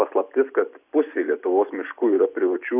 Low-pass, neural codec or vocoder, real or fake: 3.6 kHz; none; real